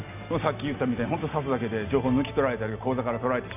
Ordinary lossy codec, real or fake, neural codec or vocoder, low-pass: none; real; none; 3.6 kHz